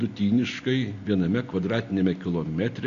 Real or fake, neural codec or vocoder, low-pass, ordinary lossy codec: real; none; 7.2 kHz; AAC, 48 kbps